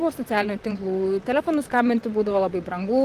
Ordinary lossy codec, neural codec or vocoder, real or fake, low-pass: Opus, 32 kbps; vocoder, 44.1 kHz, 128 mel bands, Pupu-Vocoder; fake; 14.4 kHz